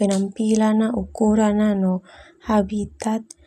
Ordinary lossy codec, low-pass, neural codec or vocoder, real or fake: none; 14.4 kHz; none; real